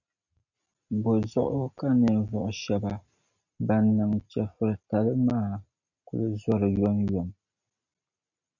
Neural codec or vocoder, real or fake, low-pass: none; real; 7.2 kHz